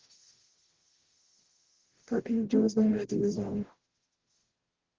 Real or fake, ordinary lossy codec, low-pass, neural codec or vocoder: fake; Opus, 16 kbps; 7.2 kHz; codec, 44.1 kHz, 0.9 kbps, DAC